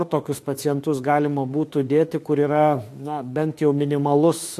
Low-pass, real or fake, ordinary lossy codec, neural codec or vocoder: 14.4 kHz; fake; AAC, 64 kbps; autoencoder, 48 kHz, 32 numbers a frame, DAC-VAE, trained on Japanese speech